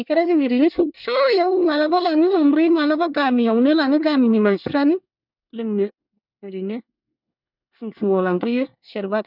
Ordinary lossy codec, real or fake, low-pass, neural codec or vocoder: none; fake; 5.4 kHz; codec, 24 kHz, 1 kbps, SNAC